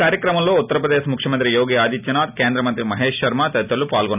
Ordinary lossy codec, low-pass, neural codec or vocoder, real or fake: none; 3.6 kHz; none; real